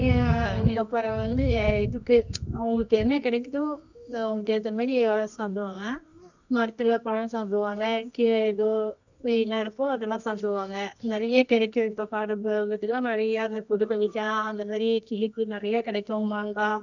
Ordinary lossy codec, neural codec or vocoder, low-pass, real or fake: none; codec, 24 kHz, 0.9 kbps, WavTokenizer, medium music audio release; 7.2 kHz; fake